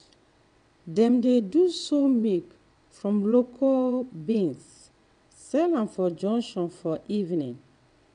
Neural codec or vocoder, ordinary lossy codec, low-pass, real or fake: vocoder, 22.05 kHz, 80 mel bands, WaveNeXt; none; 9.9 kHz; fake